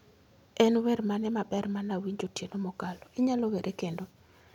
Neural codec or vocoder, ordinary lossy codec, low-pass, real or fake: none; none; 19.8 kHz; real